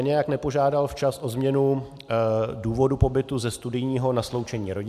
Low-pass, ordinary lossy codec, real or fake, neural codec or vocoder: 14.4 kHz; AAC, 96 kbps; real; none